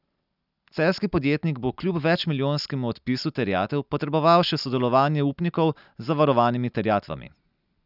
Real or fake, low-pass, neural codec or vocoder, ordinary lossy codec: real; 5.4 kHz; none; none